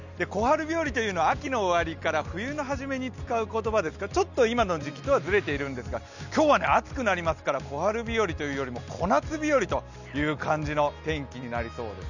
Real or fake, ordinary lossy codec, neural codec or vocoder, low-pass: real; none; none; 7.2 kHz